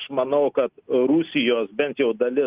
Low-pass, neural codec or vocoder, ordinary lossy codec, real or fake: 3.6 kHz; none; Opus, 32 kbps; real